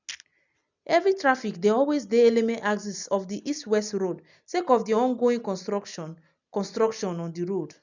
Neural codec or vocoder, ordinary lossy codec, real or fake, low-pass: none; none; real; 7.2 kHz